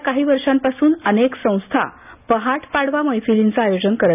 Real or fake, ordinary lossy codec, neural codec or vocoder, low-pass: real; none; none; 3.6 kHz